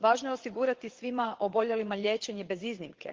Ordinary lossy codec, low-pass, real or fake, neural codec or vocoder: Opus, 16 kbps; 7.2 kHz; fake; vocoder, 22.05 kHz, 80 mel bands, WaveNeXt